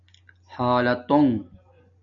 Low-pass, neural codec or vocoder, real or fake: 7.2 kHz; none; real